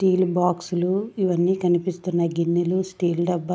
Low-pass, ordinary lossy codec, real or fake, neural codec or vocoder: none; none; real; none